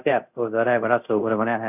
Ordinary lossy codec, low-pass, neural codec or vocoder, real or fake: none; 3.6 kHz; codec, 24 kHz, 0.5 kbps, DualCodec; fake